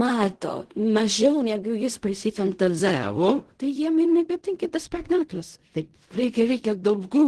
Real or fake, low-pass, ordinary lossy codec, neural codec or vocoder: fake; 10.8 kHz; Opus, 16 kbps; codec, 16 kHz in and 24 kHz out, 0.4 kbps, LongCat-Audio-Codec, fine tuned four codebook decoder